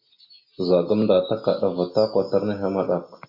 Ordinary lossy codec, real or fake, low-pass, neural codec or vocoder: MP3, 32 kbps; real; 5.4 kHz; none